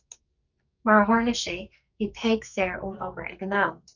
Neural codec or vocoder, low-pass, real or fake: codec, 44.1 kHz, 2.6 kbps, SNAC; 7.2 kHz; fake